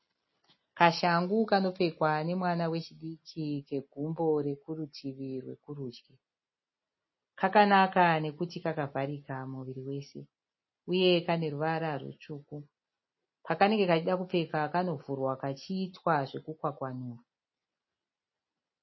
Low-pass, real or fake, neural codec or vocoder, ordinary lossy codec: 7.2 kHz; real; none; MP3, 24 kbps